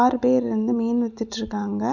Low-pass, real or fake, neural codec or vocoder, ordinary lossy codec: 7.2 kHz; real; none; none